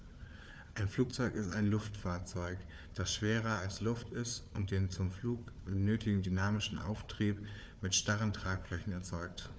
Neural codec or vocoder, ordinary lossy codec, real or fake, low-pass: codec, 16 kHz, 4 kbps, FunCodec, trained on Chinese and English, 50 frames a second; none; fake; none